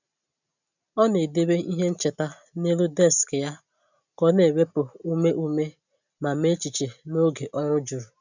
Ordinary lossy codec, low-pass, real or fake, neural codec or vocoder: none; 7.2 kHz; real; none